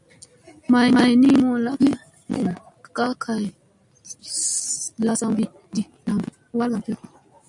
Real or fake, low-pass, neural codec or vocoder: real; 10.8 kHz; none